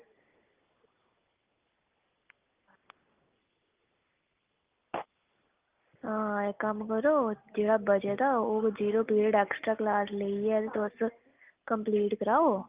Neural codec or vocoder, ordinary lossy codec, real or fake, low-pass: none; Opus, 24 kbps; real; 3.6 kHz